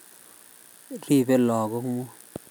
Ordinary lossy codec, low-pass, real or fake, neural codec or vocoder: none; none; real; none